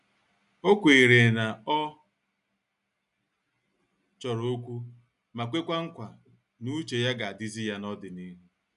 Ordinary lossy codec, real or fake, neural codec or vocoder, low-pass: none; real; none; 10.8 kHz